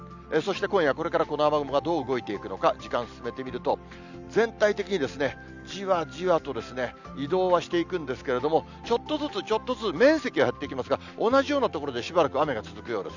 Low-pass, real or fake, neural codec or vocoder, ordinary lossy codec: 7.2 kHz; real; none; none